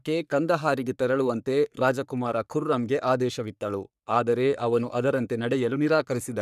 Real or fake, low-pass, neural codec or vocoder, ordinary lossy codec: fake; 14.4 kHz; codec, 44.1 kHz, 3.4 kbps, Pupu-Codec; none